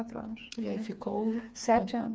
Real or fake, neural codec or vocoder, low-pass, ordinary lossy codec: fake; codec, 16 kHz, 4 kbps, FreqCodec, smaller model; none; none